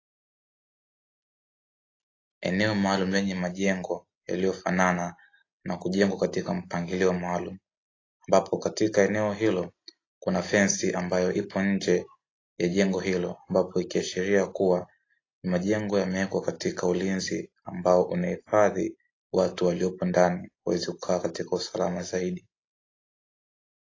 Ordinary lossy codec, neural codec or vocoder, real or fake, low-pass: AAC, 32 kbps; none; real; 7.2 kHz